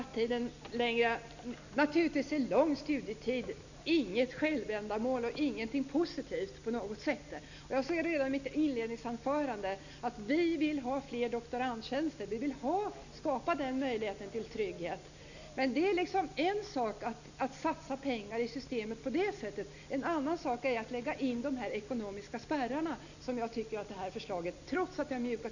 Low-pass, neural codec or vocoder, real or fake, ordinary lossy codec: 7.2 kHz; none; real; none